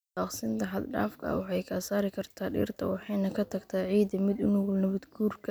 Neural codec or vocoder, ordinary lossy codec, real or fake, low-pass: none; none; real; none